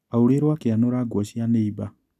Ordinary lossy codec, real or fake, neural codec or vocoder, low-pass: none; real; none; 14.4 kHz